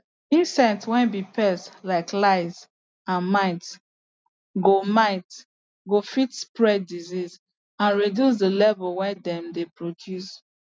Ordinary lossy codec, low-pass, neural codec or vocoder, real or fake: none; none; none; real